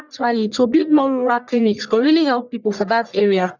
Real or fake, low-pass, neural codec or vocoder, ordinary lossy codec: fake; 7.2 kHz; codec, 44.1 kHz, 1.7 kbps, Pupu-Codec; none